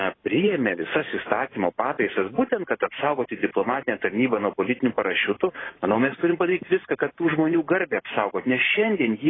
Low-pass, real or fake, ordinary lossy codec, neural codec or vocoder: 7.2 kHz; fake; AAC, 16 kbps; vocoder, 44.1 kHz, 128 mel bands every 512 samples, BigVGAN v2